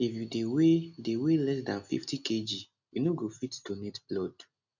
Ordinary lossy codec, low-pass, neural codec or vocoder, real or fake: none; 7.2 kHz; none; real